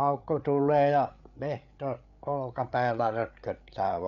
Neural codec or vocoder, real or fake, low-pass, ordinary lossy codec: codec, 16 kHz, 8 kbps, FreqCodec, larger model; fake; 7.2 kHz; none